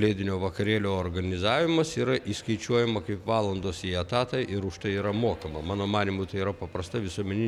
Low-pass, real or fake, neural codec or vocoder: 19.8 kHz; real; none